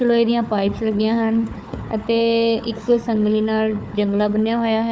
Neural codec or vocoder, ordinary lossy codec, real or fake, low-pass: codec, 16 kHz, 4 kbps, FunCodec, trained on Chinese and English, 50 frames a second; none; fake; none